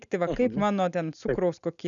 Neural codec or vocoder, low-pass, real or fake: none; 7.2 kHz; real